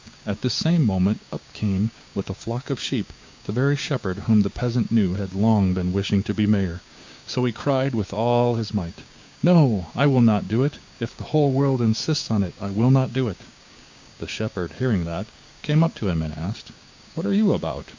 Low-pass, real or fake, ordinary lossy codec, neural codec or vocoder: 7.2 kHz; fake; MP3, 64 kbps; codec, 16 kHz, 6 kbps, DAC